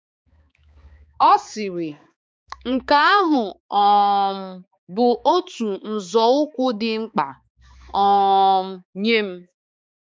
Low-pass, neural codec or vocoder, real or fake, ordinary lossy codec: none; codec, 16 kHz, 4 kbps, X-Codec, HuBERT features, trained on balanced general audio; fake; none